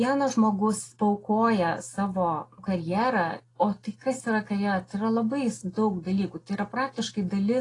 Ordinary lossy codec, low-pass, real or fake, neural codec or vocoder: AAC, 32 kbps; 10.8 kHz; real; none